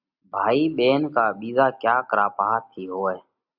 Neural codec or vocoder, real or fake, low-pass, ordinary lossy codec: none; real; 5.4 kHz; Opus, 64 kbps